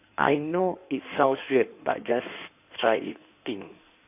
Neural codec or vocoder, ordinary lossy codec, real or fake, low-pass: codec, 16 kHz in and 24 kHz out, 1.1 kbps, FireRedTTS-2 codec; none; fake; 3.6 kHz